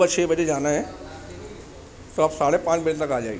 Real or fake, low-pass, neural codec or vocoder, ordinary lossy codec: real; none; none; none